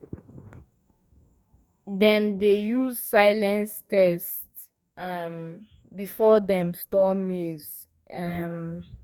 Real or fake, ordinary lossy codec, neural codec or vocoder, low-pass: fake; none; codec, 44.1 kHz, 2.6 kbps, DAC; 19.8 kHz